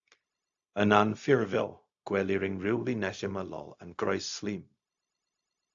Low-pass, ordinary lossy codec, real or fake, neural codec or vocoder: 7.2 kHz; AAC, 64 kbps; fake; codec, 16 kHz, 0.4 kbps, LongCat-Audio-Codec